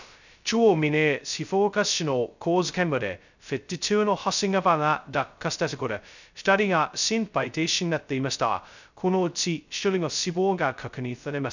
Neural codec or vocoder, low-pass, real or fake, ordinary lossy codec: codec, 16 kHz, 0.2 kbps, FocalCodec; 7.2 kHz; fake; none